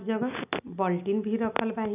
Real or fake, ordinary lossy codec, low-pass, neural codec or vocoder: real; none; 3.6 kHz; none